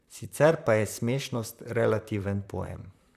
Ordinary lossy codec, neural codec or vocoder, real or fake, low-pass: none; vocoder, 44.1 kHz, 128 mel bands, Pupu-Vocoder; fake; 14.4 kHz